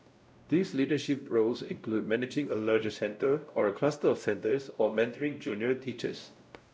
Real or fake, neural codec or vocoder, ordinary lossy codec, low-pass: fake; codec, 16 kHz, 0.5 kbps, X-Codec, WavLM features, trained on Multilingual LibriSpeech; none; none